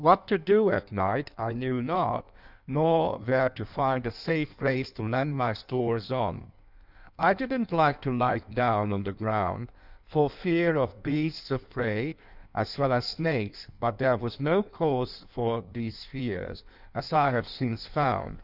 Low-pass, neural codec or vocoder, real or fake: 5.4 kHz; codec, 16 kHz in and 24 kHz out, 1.1 kbps, FireRedTTS-2 codec; fake